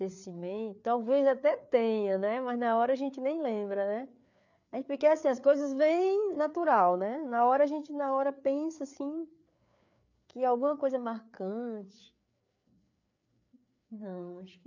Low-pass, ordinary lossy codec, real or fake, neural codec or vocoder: 7.2 kHz; none; fake; codec, 16 kHz, 4 kbps, FreqCodec, larger model